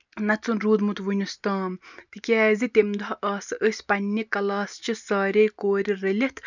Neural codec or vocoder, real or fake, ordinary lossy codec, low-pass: none; real; none; 7.2 kHz